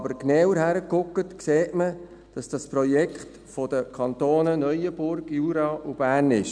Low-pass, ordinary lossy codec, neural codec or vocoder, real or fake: 9.9 kHz; none; none; real